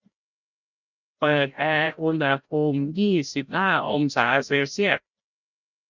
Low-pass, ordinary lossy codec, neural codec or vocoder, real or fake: 7.2 kHz; none; codec, 16 kHz, 0.5 kbps, FreqCodec, larger model; fake